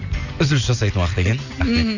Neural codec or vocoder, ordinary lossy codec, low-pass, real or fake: none; none; 7.2 kHz; real